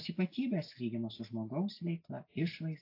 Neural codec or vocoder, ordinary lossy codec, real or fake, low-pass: none; AAC, 32 kbps; real; 5.4 kHz